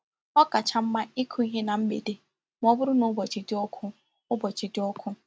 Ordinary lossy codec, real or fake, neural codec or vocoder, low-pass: none; real; none; none